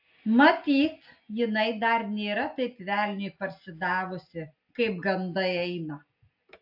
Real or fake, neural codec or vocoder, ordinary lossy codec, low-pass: real; none; AAC, 48 kbps; 5.4 kHz